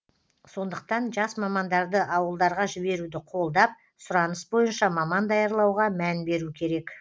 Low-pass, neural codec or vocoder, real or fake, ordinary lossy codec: none; none; real; none